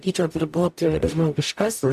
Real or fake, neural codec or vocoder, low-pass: fake; codec, 44.1 kHz, 0.9 kbps, DAC; 14.4 kHz